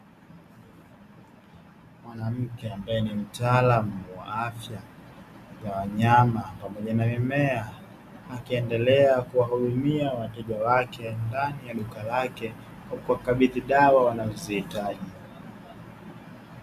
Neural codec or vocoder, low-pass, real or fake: none; 14.4 kHz; real